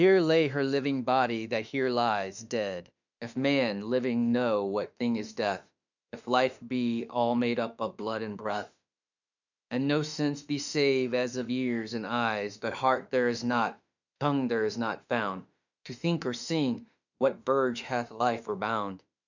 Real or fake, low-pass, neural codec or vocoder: fake; 7.2 kHz; autoencoder, 48 kHz, 32 numbers a frame, DAC-VAE, trained on Japanese speech